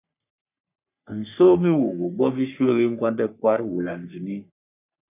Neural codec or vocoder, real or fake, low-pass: codec, 44.1 kHz, 3.4 kbps, Pupu-Codec; fake; 3.6 kHz